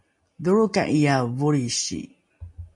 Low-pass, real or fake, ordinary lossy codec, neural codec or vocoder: 10.8 kHz; real; MP3, 48 kbps; none